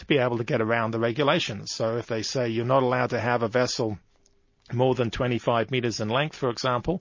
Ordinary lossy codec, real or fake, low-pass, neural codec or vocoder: MP3, 32 kbps; real; 7.2 kHz; none